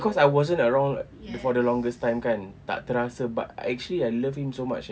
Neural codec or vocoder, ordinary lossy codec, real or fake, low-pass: none; none; real; none